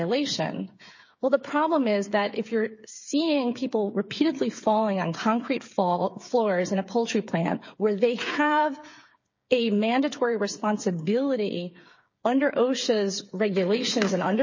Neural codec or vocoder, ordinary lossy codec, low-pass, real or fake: codec, 16 kHz, 8 kbps, FreqCodec, smaller model; MP3, 32 kbps; 7.2 kHz; fake